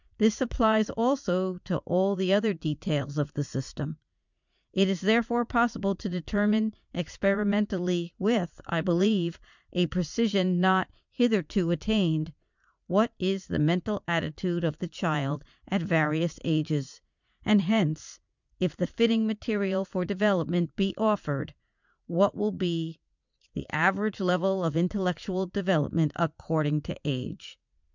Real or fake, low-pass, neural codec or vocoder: fake; 7.2 kHz; vocoder, 44.1 kHz, 80 mel bands, Vocos